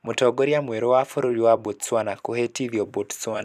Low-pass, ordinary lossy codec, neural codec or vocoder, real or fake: 14.4 kHz; none; vocoder, 44.1 kHz, 128 mel bands every 256 samples, BigVGAN v2; fake